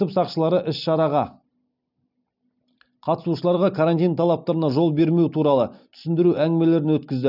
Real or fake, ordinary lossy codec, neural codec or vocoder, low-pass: real; none; none; 5.4 kHz